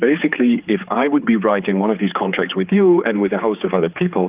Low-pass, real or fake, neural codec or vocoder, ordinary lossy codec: 3.6 kHz; fake; codec, 16 kHz, 4 kbps, X-Codec, HuBERT features, trained on general audio; Opus, 32 kbps